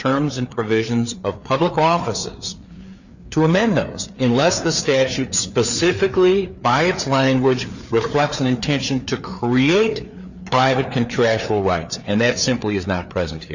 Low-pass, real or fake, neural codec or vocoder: 7.2 kHz; fake; codec, 16 kHz, 4 kbps, FreqCodec, larger model